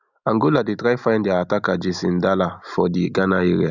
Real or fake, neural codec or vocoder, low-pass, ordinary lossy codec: real; none; 7.2 kHz; none